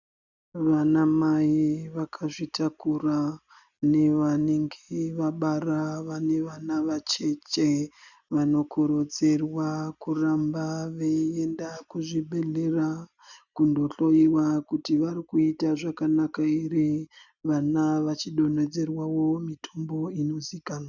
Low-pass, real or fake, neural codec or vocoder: 7.2 kHz; real; none